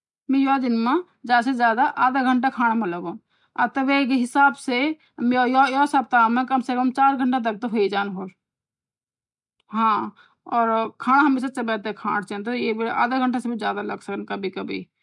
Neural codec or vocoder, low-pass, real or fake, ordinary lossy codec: none; 10.8 kHz; real; none